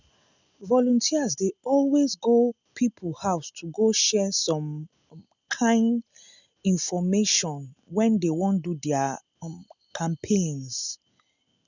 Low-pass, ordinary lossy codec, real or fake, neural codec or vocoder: 7.2 kHz; none; real; none